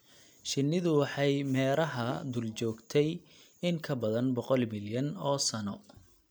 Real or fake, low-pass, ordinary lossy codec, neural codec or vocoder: real; none; none; none